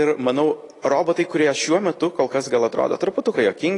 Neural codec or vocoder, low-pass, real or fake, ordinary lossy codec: none; 10.8 kHz; real; AAC, 32 kbps